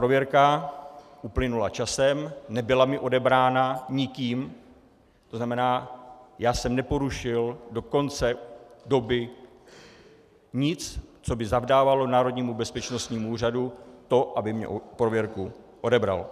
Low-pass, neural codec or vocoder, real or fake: 14.4 kHz; none; real